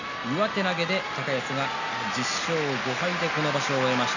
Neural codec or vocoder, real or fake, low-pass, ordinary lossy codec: none; real; 7.2 kHz; none